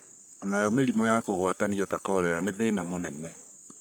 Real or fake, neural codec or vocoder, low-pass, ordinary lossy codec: fake; codec, 44.1 kHz, 3.4 kbps, Pupu-Codec; none; none